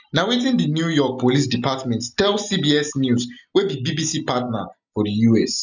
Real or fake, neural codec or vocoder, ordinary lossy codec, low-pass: real; none; none; 7.2 kHz